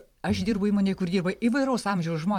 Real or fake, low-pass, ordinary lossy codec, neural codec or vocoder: fake; 19.8 kHz; MP3, 96 kbps; vocoder, 44.1 kHz, 128 mel bands every 512 samples, BigVGAN v2